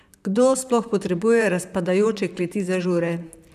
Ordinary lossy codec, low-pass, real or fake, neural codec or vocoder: none; 14.4 kHz; fake; vocoder, 44.1 kHz, 128 mel bands, Pupu-Vocoder